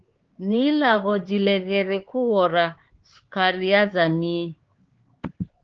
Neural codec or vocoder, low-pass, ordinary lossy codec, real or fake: codec, 16 kHz, 4 kbps, X-Codec, HuBERT features, trained on LibriSpeech; 7.2 kHz; Opus, 16 kbps; fake